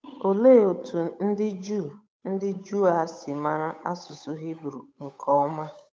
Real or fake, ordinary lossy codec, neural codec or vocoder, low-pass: fake; none; codec, 16 kHz, 8 kbps, FunCodec, trained on Chinese and English, 25 frames a second; none